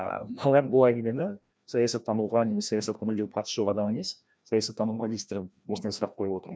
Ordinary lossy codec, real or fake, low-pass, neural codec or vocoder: none; fake; none; codec, 16 kHz, 1 kbps, FreqCodec, larger model